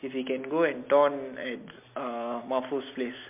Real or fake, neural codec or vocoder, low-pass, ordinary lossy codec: real; none; 3.6 kHz; MP3, 32 kbps